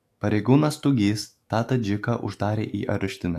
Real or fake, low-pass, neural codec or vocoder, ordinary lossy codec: fake; 14.4 kHz; autoencoder, 48 kHz, 128 numbers a frame, DAC-VAE, trained on Japanese speech; AAC, 64 kbps